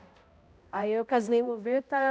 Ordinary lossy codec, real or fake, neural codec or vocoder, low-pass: none; fake; codec, 16 kHz, 0.5 kbps, X-Codec, HuBERT features, trained on balanced general audio; none